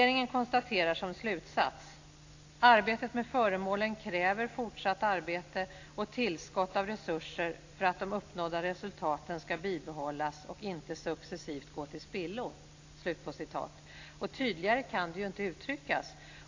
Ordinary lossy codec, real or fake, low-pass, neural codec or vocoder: AAC, 48 kbps; real; 7.2 kHz; none